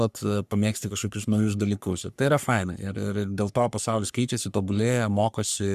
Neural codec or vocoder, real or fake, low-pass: codec, 44.1 kHz, 3.4 kbps, Pupu-Codec; fake; 14.4 kHz